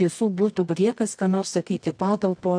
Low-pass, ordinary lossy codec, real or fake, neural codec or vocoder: 9.9 kHz; AAC, 64 kbps; fake; codec, 24 kHz, 0.9 kbps, WavTokenizer, medium music audio release